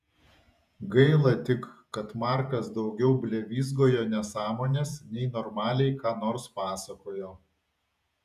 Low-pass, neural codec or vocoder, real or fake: 14.4 kHz; vocoder, 48 kHz, 128 mel bands, Vocos; fake